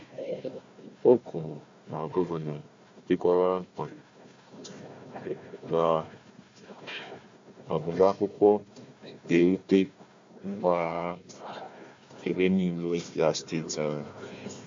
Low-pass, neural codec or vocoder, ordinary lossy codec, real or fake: 7.2 kHz; codec, 16 kHz, 1 kbps, FunCodec, trained on Chinese and English, 50 frames a second; MP3, 48 kbps; fake